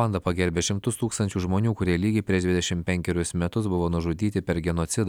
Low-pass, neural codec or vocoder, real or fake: 19.8 kHz; none; real